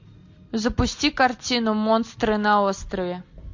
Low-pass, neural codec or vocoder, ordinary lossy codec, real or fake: 7.2 kHz; none; MP3, 48 kbps; real